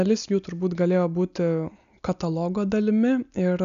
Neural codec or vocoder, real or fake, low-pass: none; real; 7.2 kHz